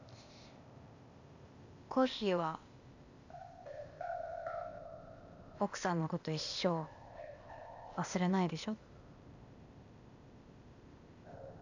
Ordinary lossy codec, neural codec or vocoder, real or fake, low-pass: none; codec, 16 kHz, 0.8 kbps, ZipCodec; fake; 7.2 kHz